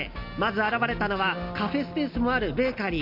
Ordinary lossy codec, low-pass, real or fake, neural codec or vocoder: none; 5.4 kHz; real; none